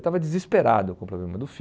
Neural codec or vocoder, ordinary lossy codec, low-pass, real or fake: none; none; none; real